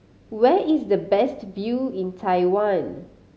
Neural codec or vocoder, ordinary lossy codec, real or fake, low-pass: none; none; real; none